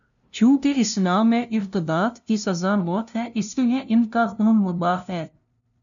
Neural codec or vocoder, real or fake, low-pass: codec, 16 kHz, 0.5 kbps, FunCodec, trained on LibriTTS, 25 frames a second; fake; 7.2 kHz